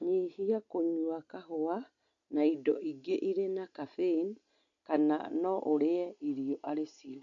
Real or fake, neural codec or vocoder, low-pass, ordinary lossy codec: real; none; 7.2 kHz; none